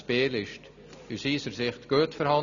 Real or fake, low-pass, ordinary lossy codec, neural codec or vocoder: real; 7.2 kHz; none; none